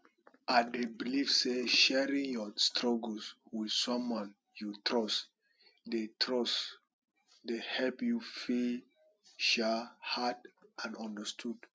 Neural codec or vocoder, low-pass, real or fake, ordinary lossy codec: none; none; real; none